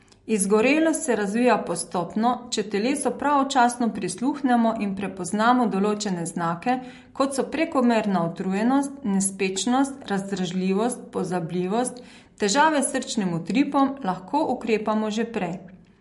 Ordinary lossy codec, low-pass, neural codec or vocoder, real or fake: MP3, 48 kbps; 14.4 kHz; none; real